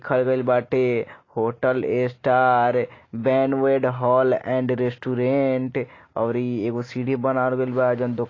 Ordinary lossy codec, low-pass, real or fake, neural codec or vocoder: AAC, 32 kbps; 7.2 kHz; real; none